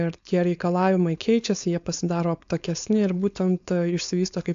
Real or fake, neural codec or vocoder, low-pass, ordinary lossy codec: fake; codec, 16 kHz, 4.8 kbps, FACodec; 7.2 kHz; AAC, 64 kbps